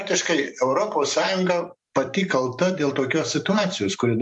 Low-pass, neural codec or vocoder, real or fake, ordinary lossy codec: 10.8 kHz; vocoder, 44.1 kHz, 128 mel bands every 512 samples, BigVGAN v2; fake; MP3, 64 kbps